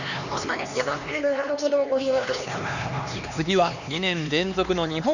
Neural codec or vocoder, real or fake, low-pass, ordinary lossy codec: codec, 16 kHz, 2 kbps, X-Codec, HuBERT features, trained on LibriSpeech; fake; 7.2 kHz; none